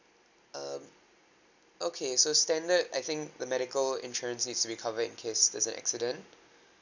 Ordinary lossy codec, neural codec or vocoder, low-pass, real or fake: none; none; 7.2 kHz; real